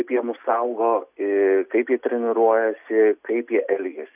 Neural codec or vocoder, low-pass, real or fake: none; 3.6 kHz; real